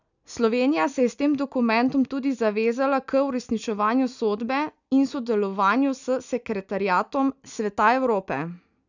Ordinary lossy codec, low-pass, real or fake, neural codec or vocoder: none; 7.2 kHz; real; none